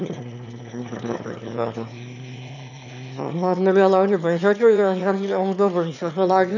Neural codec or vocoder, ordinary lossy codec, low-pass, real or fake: autoencoder, 22.05 kHz, a latent of 192 numbers a frame, VITS, trained on one speaker; none; 7.2 kHz; fake